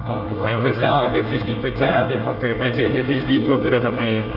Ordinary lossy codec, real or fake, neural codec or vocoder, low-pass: none; fake; codec, 24 kHz, 1 kbps, SNAC; 5.4 kHz